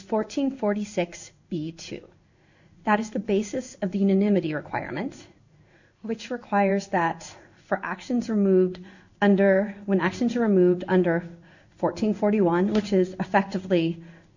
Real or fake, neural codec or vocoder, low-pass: fake; codec, 16 kHz in and 24 kHz out, 1 kbps, XY-Tokenizer; 7.2 kHz